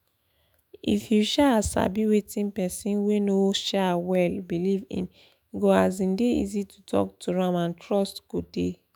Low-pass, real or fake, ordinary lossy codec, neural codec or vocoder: none; fake; none; autoencoder, 48 kHz, 128 numbers a frame, DAC-VAE, trained on Japanese speech